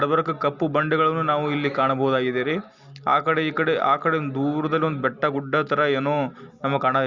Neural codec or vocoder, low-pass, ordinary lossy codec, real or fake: none; 7.2 kHz; Opus, 64 kbps; real